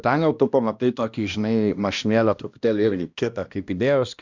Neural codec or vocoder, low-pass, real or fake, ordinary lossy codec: codec, 16 kHz, 1 kbps, X-Codec, HuBERT features, trained on balanced general audio; 7.2 kHz; fake; Opus, 64 kbps